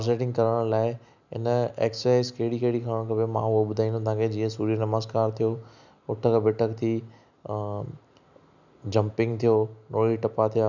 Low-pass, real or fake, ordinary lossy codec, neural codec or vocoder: 7.2 kHz; real; none; none